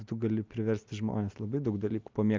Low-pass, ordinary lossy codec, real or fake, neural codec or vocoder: 7.2 kHz; Opus, 24 kbps; real; none